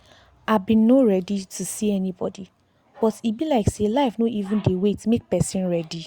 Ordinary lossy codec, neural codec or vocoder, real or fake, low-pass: Opus, 64 kbps; none; real; 19.8 kHz